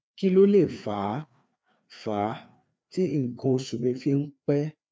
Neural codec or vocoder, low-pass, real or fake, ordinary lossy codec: codec, 16 kHz, 2 kbps, FreqCodec, larger model; none; fake; none